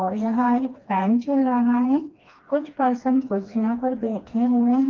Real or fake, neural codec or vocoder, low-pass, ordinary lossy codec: fake; codec, 16 kHz, 2 kbps, FreqCodec, smaller model; 7.2 kHz; Opus, 32 kbps